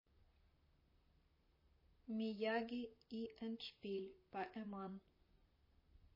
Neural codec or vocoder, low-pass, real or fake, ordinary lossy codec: vocoder, 44.1 kHz, 128 mel bands, Pupu-Vocoder; 5.4 kHz; fake; MP3, 24 kbps